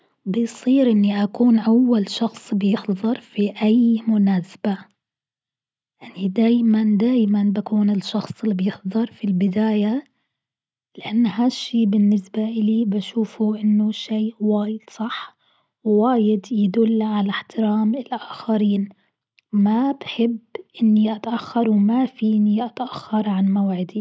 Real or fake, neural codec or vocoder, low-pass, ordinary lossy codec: real; none; none; none